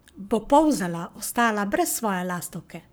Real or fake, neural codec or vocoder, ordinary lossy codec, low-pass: fake; codec, 44.1 kHz, 7.8 kbps, Pupu-Codec; none; none